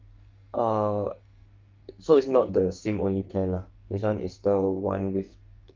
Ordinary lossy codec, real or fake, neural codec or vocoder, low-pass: Opus, 32 kbps; fake; codec, 44.1 kHz, 2.6 kbps, SNAC; 7.2 kHz